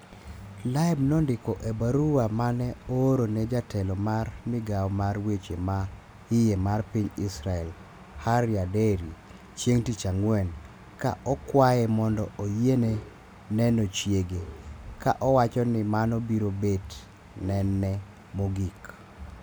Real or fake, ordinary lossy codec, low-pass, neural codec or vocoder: real; none; none; none